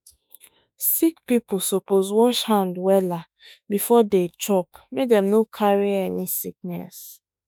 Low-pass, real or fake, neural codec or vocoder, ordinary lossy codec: none; fake; autoencoder, 48 kHz, 32 numbers a frame, DAC-VAE, trained on Japanese speech; none